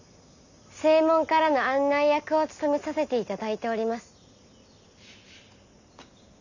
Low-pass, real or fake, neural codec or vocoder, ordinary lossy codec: 7.2 kHz; real; none; none